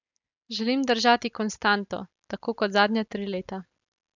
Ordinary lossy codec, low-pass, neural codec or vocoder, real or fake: none; 7.2 kHz; none; real